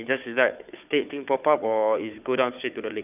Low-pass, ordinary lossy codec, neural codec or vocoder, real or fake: 3.6 kHz; none; codec, 16 kHz, 4 kbps, FunCodec, trained on Chinese and English, 50 frames a second; fake